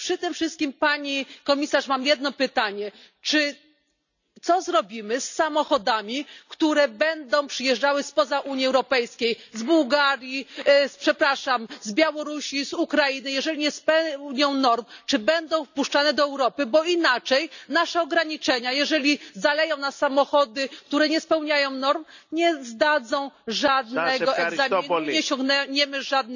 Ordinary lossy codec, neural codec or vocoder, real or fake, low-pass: none; none; real; 7.2 kHz